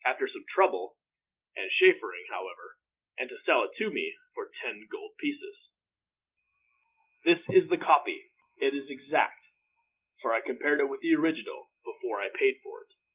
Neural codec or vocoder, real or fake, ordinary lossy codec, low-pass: none; real; Opus, 32 kbps; 3.6 kHz